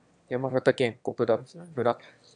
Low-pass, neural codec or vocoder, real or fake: 9.9 kHz; autoencoder, 22.05 kHz, a latent of 192 numbers a frame, VITS, trained on one speaker; fake